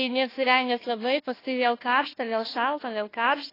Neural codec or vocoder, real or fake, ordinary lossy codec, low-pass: codec, 16 kHz, 1 kbps, FunCodec, trained on Chinese and English, 50 frames a second; fake; AAC, 24 kbps; 5.4 kHz